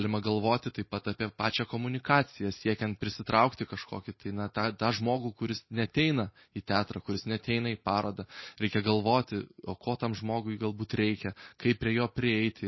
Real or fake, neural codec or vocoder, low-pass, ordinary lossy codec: real; none; 7.2 kHz; MP3, 24 kbps